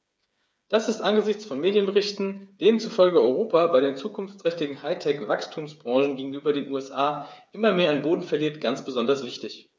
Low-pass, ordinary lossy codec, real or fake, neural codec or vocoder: none; none; fake; codec, 16 kHz, 8 kbps, FreqCodec, smaller model